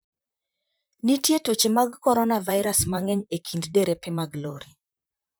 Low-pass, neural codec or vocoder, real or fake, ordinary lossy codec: none; vocoder, 44.1 kHz, 128 mel bands, Pupu-Vocoder; fake; none